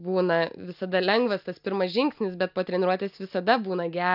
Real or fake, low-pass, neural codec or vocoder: real; 5.4 kHz; none